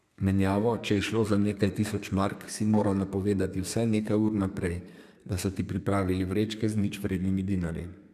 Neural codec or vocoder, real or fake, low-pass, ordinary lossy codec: codec, 32 kHz, 1.9 kbps, SNAC; fake; 14.4 kHz; none